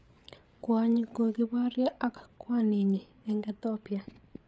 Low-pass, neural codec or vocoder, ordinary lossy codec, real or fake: none; codec, 16 kHz, 8 kbps, FreqCodec, larger model; none; fake